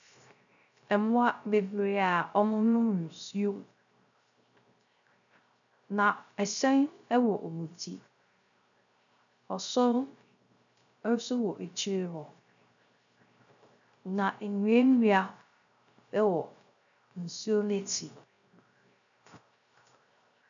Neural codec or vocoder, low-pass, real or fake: codec, 16 kHz, 0.3 kbps, FocalCodec; 7.2 kHz; fake